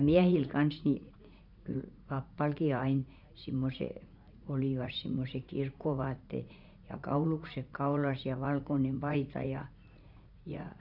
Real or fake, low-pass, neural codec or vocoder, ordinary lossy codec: fake; 5.4 kHz; vocoder, 44.1 kHz, 80 mel bands, Vocos; none